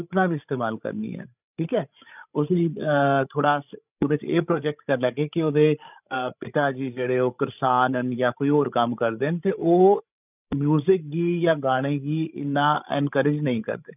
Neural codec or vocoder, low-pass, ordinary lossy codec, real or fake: codec, 16 kHz, 16 kbps, FreqCodec, larger model; 3.6 kHz; none; fake